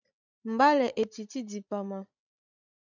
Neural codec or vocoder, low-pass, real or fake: codec, 16 kHz, 16 kbps, FreqCodec, larger model; 7.2 kHz; fake